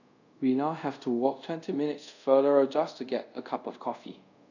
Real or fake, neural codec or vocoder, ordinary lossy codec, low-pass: fake; codec, 24 kHz, 0.5 kbps, DualCodec; none; 7.2 kHz